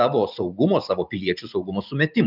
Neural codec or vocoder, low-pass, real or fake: none; 5.4 kHz; real